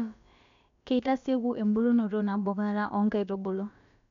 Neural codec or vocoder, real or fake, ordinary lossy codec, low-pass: codec, 16 kHz, about 1 kbps, DyCAST, with the encoder's durations; fake; none; 7.2 kHz